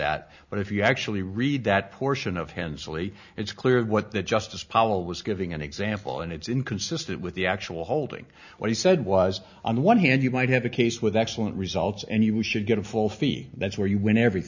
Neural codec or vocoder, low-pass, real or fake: none; 7.2 kHz; real